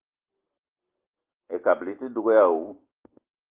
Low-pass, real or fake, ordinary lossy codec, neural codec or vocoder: 3.6 kHz; real; Opus, 16 kbps; none